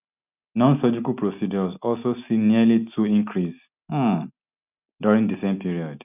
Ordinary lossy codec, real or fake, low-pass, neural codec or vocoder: none; real; 3.6 kHz; none